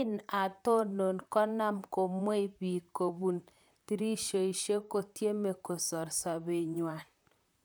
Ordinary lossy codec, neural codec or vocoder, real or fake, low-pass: none; vocoder, 44.1 kHz, 128 mel bands, Pupu-Vocoder; fake; none